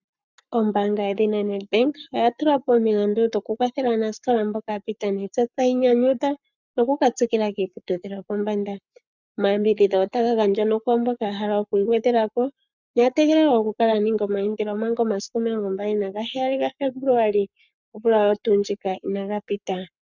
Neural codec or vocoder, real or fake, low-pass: codec, 44.1 kHz, 7.8 kbps, Pupu-Codec; fake; 7.2 kHz